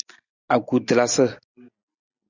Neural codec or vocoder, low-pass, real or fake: none; 7.2 kHz; real